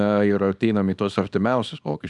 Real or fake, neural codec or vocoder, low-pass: fake; codec, 24 kHz, 0.9 kbps, WavTokenizer, small release; 10.8 kHz